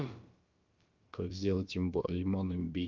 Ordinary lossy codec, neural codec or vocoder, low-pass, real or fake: Opus, 32 kbps; codec, 16 kHz, about 1 kbps, DyCAST, with the encoder's durations; 7.2 kHz; fake